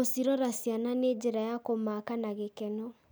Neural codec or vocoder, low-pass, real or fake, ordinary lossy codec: none; none; real; none